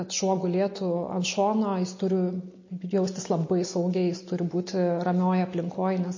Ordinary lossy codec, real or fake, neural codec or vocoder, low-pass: MP3, 32 kbps; real; none; 7.2 kHz